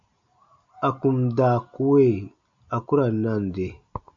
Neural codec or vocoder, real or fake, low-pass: none; real; 7.2 kHz